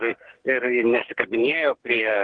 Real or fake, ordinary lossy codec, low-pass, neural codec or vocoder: fake; Opus, 16 kbps; 9.9 kHz; codec, 44.1 kHz, 2.6 kbps, SNAC